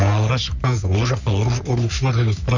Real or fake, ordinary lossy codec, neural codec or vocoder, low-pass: fake; none; codec, 44.1 kHz, 3.4 kbps, Pupu-Codec; 7.2 kHz